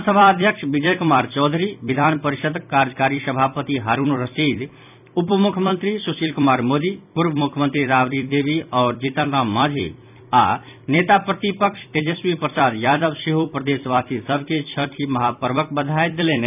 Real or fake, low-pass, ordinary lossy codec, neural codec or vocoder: fake; 3.6 kHz; none; vocoder, 44.1 kHz, 128 mel bands every 256 samples, BigVGAN v2